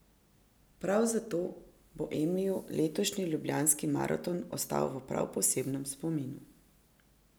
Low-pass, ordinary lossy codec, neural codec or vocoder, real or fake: none; none; none; real